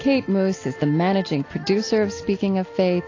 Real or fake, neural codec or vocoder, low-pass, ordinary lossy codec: real; none; 7.2 kHz; AAC, 32 kbps